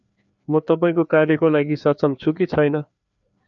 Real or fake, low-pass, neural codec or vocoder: fake; 7.2 kHz; codec, 16 kHz, 2 kbps, FreqCodec, larger model